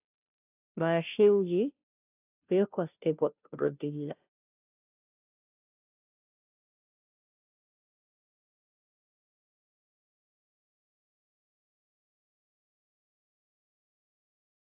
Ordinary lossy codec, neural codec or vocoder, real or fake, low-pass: AAC, 32 kbps; codec, 16 kHz, 0.5 kbps, FunCodec, trained on Chinese and English, 25 frames a second; fake; 3.6 kHz